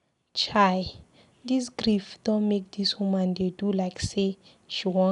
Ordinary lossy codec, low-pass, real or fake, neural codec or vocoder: none; 10.8 kHz; real; none